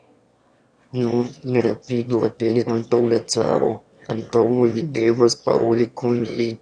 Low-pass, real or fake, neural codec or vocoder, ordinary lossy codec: 9.9 kHz; fake; autoencoder, 22.05 kHz, a latent of 192 numbers a frame, VITS, trained on one speaker; Opus, 64 kbps